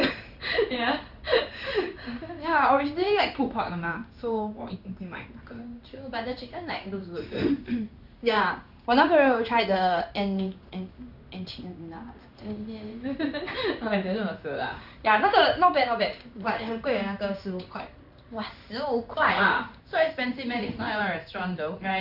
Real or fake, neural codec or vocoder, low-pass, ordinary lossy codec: fake; codec, 16 kHz in and 24 kHz out, 1 kbps, XY-Tokenizer; 5.4 kHz; none